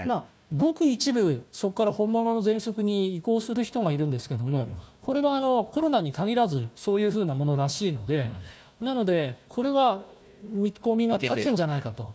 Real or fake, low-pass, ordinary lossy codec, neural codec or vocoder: fake; none; none; codec, 16 kHz, 1 kbps, FunCodec, trained on Chinese and English, 50 frames a second